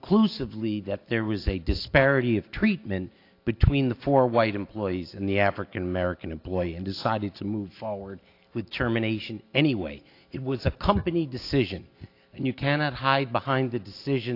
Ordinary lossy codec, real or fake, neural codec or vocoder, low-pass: AAC, 32 kbps; real; none; 5.4 kHz